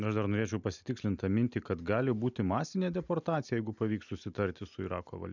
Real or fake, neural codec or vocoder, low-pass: real; none; 7.2 kHz